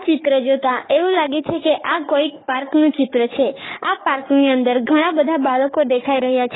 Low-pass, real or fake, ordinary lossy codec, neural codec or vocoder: 7.2 kHz; fake; AAC, 16 kbps; codec, 44.1 kHz, 3.4 kbps, Pupu-Codec